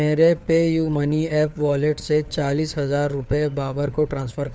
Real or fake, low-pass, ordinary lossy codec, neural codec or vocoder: fake; none; none; codec, 16 kHz, 4 kbps, FunCodec, trained on LibriTTS, 50 frames a second